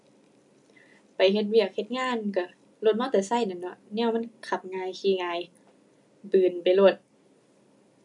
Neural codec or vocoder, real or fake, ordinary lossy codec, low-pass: none; real; MP3, 64 kbps; 10.8 kHz